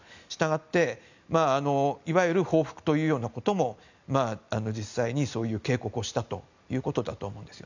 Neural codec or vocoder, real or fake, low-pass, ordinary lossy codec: none; real; 7.2 kHz; none